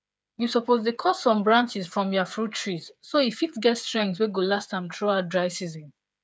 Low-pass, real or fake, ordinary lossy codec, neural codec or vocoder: none; fake; none; codec, 16 kHz, 8 kbps, FreqCodec, smaller model